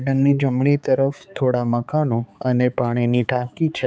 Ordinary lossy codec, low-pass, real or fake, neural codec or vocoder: none; none; fake; codec, 16 kHz, 4 kbps, X-Codec, HuBERT features, trained on balanced general audio